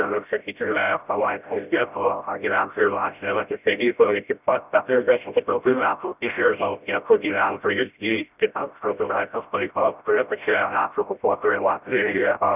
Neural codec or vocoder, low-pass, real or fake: codec, 16 kHz, 0.5 kbps, FreqCodec, smaller model; 3.6 kHz; fake